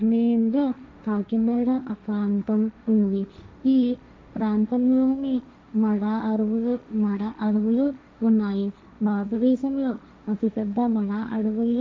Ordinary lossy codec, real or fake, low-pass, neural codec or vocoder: none; fake; none; codec, 16 kHz, 1.1 kbps, Voila-Tokenizer